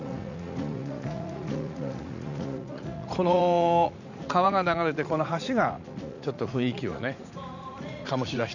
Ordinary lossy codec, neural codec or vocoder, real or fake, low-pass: none; vocoder, 44.1 kHz, 80 mel bands, Vocos; fake; 7.2 kHz